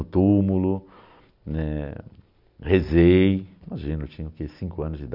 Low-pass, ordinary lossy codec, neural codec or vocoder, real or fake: 5.4 kHz; none; none; real